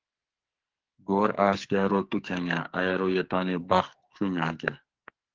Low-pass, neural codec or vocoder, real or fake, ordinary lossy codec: 7.2 kHz; codec, 44.1 kHz, 2.6 kbps, SNAC; fake; Opus, 16 kbps